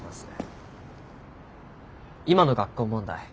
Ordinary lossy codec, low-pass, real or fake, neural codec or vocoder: none; none; real; none